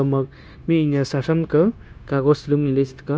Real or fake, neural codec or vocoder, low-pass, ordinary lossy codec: fake; codec, 16 kHz, 0.9 kbps, LongCat-Audio-Codec; none; none